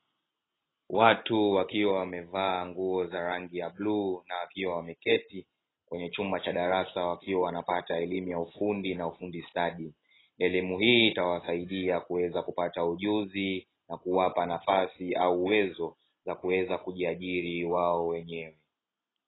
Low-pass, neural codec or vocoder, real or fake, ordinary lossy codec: 7.2 kHz; none; real; AAC, 16 kbps